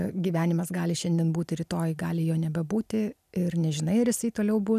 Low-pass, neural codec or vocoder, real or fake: 14.4 kHz; none; real